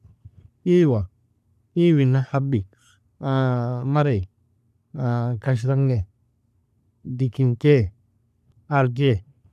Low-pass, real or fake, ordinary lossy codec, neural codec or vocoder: 14.4 kHz; fake; none; codec, 44.1 kHz, 3.4 kbps, Pupu-Codec